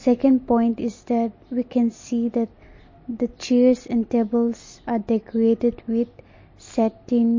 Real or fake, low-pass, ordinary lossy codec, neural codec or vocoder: real; 7.2 kHz; MP3, 32 kbps; none